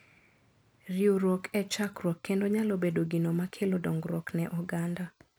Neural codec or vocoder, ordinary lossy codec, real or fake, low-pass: none; none; real; none